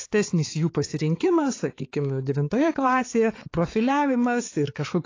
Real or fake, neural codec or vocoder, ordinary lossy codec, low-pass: fake; codec, 16 kHz, 4 kbps, X-Codec, HuBERT features, trained on balanced general audio; AAC, 32 kbps; 7.2 kHz